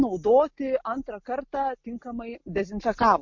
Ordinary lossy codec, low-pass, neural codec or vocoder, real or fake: MP3, 48 kbps; 7.2 kHz; none; real